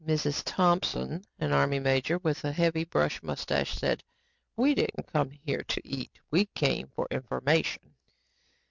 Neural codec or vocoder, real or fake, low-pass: none; real; 7.2 kHz